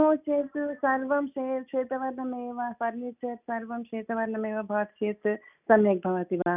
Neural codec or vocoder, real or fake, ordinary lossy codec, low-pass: none; real; none; 3.6 kHz